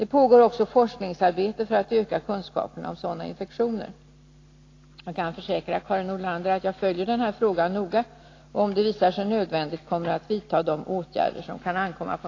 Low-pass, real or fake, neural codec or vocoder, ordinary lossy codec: 7.2 kHz; real; none; AAC, 32 kbps